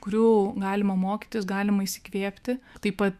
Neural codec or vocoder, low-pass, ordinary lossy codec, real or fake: autoencoder, 48 kHz, 128 numbers a frame, DAC-VAE, trained on Japanese speech; 14.4 kHz; Opus, 64 kbps; fake